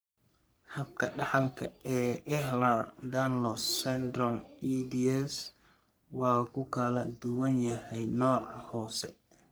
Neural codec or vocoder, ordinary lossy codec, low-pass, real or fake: codec, 44.1 kHz, 3.4 kbps, Pupu-Codec; none; none; fake